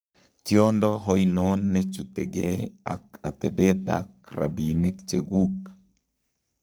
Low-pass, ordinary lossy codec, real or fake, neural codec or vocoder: none; none; fake; codec, 44.1 kHz, 3.4 kbps, Pupu-Codec